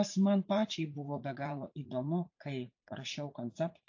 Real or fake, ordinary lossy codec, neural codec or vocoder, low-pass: fake; AAC, 48 kbps; vocoder, 44.1 kHz, 80 mel bands, Vocos; 7.2 kHz